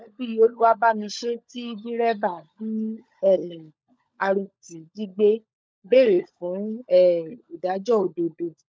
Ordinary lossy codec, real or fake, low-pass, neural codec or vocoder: none; fake; none; codec, 16 kHz, 16 kbps, FunCodec, trained on LibriTTS, 50 frames a second